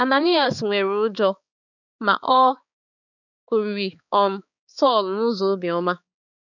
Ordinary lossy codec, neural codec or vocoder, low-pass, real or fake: none; codec, 16 kHz, 4 kbps, X-Codec, HuBERT features, trained on balanced general audio; 7.2 kHz; fake